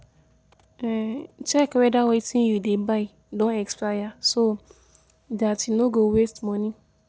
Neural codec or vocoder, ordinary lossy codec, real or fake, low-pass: none; none; real; none